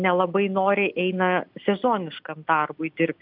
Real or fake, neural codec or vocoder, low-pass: real; none; 5.4 kHz